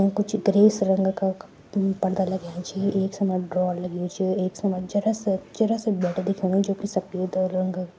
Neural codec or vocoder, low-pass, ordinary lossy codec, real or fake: none; none; none; real